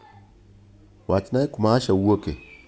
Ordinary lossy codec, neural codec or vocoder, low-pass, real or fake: none; none; none; real